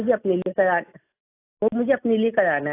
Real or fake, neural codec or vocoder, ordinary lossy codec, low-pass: real; none; AAC, 24 kbps; 3.6 kHz